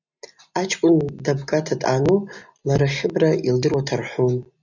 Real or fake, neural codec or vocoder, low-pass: real; none; 7.2 kHz